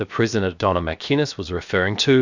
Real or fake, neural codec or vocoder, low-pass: fake; codec, 16 kHz, 0.7 kbps, FocalCodec; 7.2 kHz